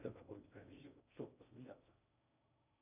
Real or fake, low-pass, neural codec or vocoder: fake; 3.6 kHz; codec, 16 kHz in and 24 kHz out, 0.6 kbps, FocalCodec, streaming, 2048 codes